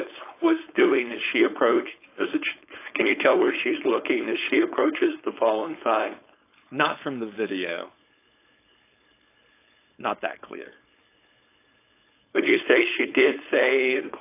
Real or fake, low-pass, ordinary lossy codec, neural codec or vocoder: fake; 3.6 kHz; AAC, 24 kbps; codec, 16 kHz, 4.8 kbps, FACodec